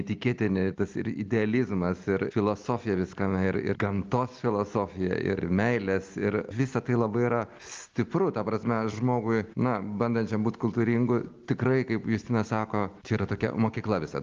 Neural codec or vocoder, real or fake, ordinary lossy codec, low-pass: none; real; Opus, 32 kbps; 7.2 kHz